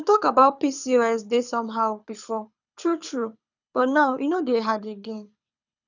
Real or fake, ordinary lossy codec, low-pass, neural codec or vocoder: fake; none; 7.2 kHz; codec, 24 kHz, 6 kbps, HILCodec